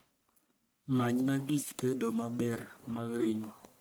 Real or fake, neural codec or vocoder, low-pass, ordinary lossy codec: fake; codec, 44.1 kHz, 1.7 kbps, Pupu-Codec; none; none